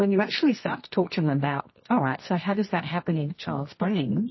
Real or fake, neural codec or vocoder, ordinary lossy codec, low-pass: fake; codec, 24 kHz, 0.9 kbps, WavTokenizer, medium music audio release; MP3, 24 kbps; 7.2 kHz